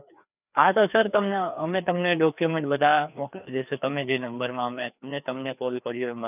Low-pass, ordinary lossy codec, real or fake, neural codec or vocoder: 3.6 kHz; none; fake; codec, 16 kHz, 2 kbps, FreqCodec, larger model